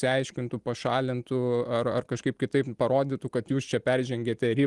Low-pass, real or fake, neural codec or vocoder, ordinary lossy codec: 10.8 kHz; real; none; Opus, 24 kbps